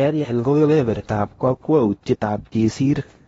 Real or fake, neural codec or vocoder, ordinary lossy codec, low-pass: fake; codec, 16 kHz in and 24 kHz out, 0.8 kbps, FocalCodec, streaming, 65536 codes; AAC, 24 kbps; 10.8 kHz